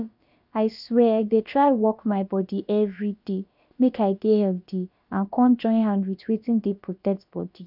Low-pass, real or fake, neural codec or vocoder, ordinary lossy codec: 5.4 kHz; fake; codec, 16 kHz, about 1 kbps, DyCAST, with the encoder's durations; none